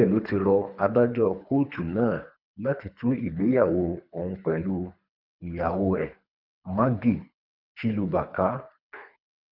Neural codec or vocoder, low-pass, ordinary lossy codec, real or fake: codec, 24 kHz, 3 kbps, HILCodec; 5.4 kHz; none; fake